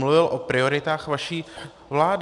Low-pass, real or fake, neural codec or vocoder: 10.8 kHz; real; none